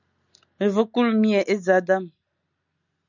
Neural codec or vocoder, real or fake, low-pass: none; real; 7.2 kHz